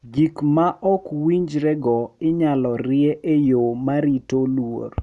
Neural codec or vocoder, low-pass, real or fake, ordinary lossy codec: none; none; real; none